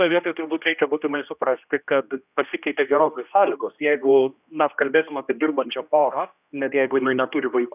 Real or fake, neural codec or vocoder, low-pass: fake; codec, 16 kHz, 1 kbps, X-Codec, HuBERT features, trained on general audio; 3.6 kHz